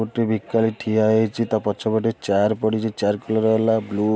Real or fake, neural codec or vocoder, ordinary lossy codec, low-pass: real; none; none; none